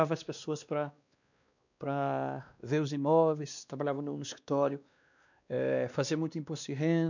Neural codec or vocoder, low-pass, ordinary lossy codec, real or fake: codec, 16 kHz, 2 kbps, X-Codec, WavLM features, trained on Multilingual LibriSpeech; 7.2 kHz; none; fake